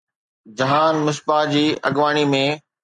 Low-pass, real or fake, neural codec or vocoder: 10.8 kHz; real; none